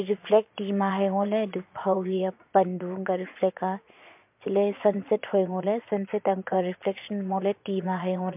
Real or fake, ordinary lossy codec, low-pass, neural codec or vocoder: fake; MP3, 32 kbps; 3.6 kHz; vocoder, 22.05 kHz, 80 mel bands, Vocos